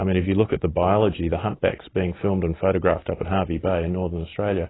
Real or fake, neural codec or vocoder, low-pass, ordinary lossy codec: real; none; 7.2 kHz; AAC, 16 kbps